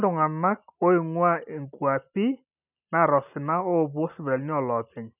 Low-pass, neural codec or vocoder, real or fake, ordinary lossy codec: 3.6 kHz; none; real; none